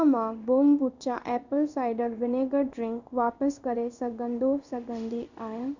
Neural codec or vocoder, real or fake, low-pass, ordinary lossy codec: codec, 16 kHz in and 24 kHz out, 1 kbps, XY-Tokenizer; fake; 7.2 kHz; none